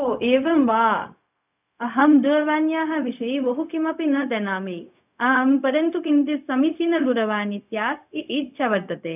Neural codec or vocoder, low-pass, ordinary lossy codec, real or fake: codec, 16 kHz, 0.4 kbps, LongCat-Audio-Codec; 3.6 kHz; none; fake